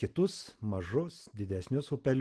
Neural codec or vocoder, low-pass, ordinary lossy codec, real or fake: none; 10.8 kHz; Opus, 16 kbps; real